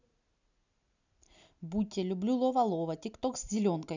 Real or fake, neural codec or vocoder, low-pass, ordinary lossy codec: real; none; 7.2 kHz; none